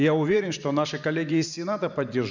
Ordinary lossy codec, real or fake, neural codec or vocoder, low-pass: none; fake; vocoder, 44.1 kHz, 128 mel bands every 512 samples, BigVGAN v2; 7.2 kHz